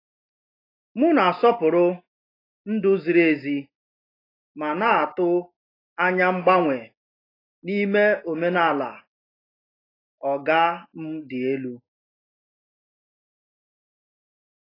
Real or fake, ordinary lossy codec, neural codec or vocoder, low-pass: real; AAC, 24 kbps; none; 5.4 kHz